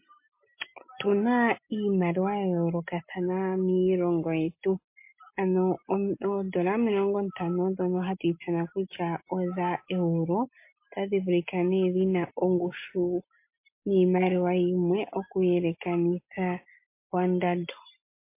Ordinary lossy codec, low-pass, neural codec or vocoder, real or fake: MP3, 24 kbps; 3.6 kHz; none; real